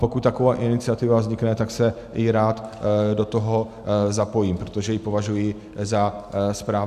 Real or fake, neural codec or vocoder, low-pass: real; none; 14.4 kHz